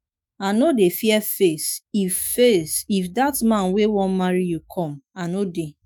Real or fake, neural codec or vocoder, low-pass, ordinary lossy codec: fake; autoencoder, 48 kHz, 128 numbers a frame, DAC-VAE, trained on Japanese speech; none; none